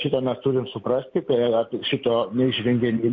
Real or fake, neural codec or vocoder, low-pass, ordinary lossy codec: real; none; 7.2 kHz; MP3, 48 kbps